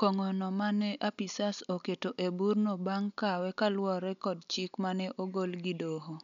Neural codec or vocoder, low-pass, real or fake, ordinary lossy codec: none; 7.2 kHz; real; none